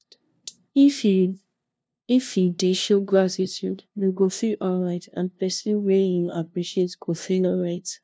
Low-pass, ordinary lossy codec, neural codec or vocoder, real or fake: none; none; codec, 16 kHz, 0.5 kbps, FunCodec, trained on LibriTTS, 25 frames a second; fake